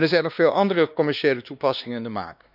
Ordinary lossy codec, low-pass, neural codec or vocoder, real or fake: none; 5.4 kHz; codec, 16 kHz, 2 kbps, X-Codec, WavLM features, trained on Multilingual LibriSpeech; fake